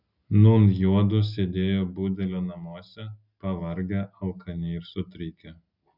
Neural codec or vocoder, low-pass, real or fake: none; 5.4 kHz; real